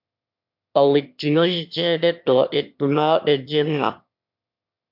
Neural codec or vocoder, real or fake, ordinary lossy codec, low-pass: autoencoder, 22.05 kHz, a latent of 192 numbers a frame, VITS, trained on one speaker; fake; MP3, 48 kbps; 5.4 kHz